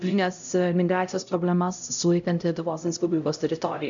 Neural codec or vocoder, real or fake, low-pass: codec, 16 kHz, 0.5 kbps, X-Codec, HuBERT features, trained on LibriSpeech; fake; 7.2 kHz